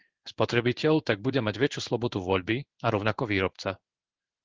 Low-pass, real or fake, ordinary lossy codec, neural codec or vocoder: 7.2 kHz; fake; Opus, 16 kbps; codec, 16 kHz in and 24 kHz out, 1 kbps, XY-Tokenizer